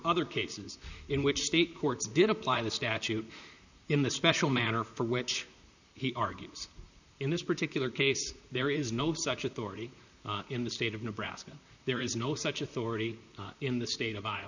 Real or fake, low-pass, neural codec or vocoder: fake; 7.2 kHz; vocoder, 44.1 kHz, 128 mel bands, Pupu-Vocoder